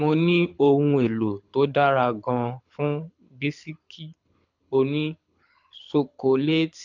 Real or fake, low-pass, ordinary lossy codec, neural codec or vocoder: fake; 7.2 kHz; MP3, 64 kbps; codec, 24 kHz, 6 kbps, HILCodec